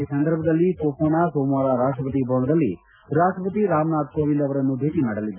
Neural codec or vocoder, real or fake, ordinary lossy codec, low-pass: none; real; none; 3.6 kHz